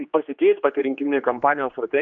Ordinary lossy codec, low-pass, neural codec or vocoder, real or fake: AAC, 64 kbps; 7.2 kHz; codec, 16 kHz, 2 kbps, X-Codec, HuBERT features, trained on general audio; fake